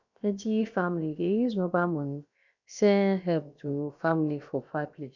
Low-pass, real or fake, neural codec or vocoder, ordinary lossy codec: 7.2 kHz; fake; codec, 16 kHz, about 1 kbps, DyCAST, with the encoder's durations; none